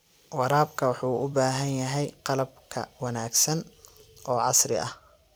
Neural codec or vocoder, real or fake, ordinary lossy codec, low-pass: vocoder, 44.1 kHz, 128 mel bands every 256 samples, BigVGAN v2; fake; none; none